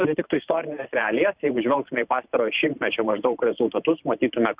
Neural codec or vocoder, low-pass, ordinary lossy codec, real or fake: vocoder, 44.1 kHz, 128 mel bands every 512 samples, BigVGAN v2; 3.6 kHz; Opus, 64 kbps; fake